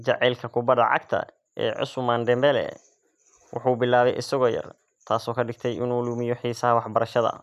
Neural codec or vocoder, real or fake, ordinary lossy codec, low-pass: none; real; none; 14.4 kHz